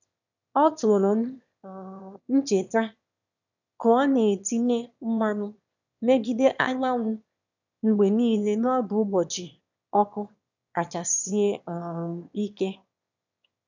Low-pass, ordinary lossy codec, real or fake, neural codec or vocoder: 7.2 kHz; none; fake; autoencoder, 22.05 kHz, a latent of 192 numbers a frame, VITS, trained on one speaker